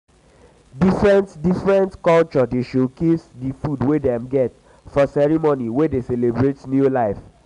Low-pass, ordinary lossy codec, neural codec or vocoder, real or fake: 10.8 kHz; none; none; real